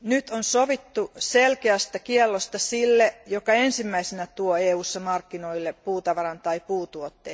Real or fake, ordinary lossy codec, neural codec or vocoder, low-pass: real; none; none; none